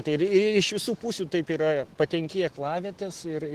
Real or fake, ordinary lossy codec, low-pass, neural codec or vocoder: fake; Opus, 16 kbps; 14.4 kHz; autoencoder, 48 kHz, 128 numbers a frame, DAC-VAE, trained on Japanese speech